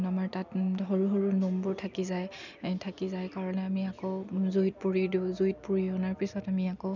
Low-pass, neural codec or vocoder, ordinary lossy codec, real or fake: 7.2 kHz; none; none; real